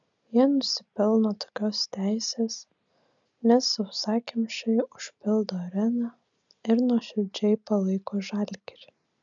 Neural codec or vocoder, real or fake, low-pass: none; real; 7.2 kHz